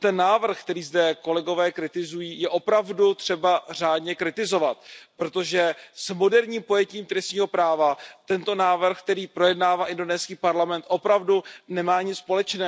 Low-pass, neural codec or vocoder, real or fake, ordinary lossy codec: none; none; real; none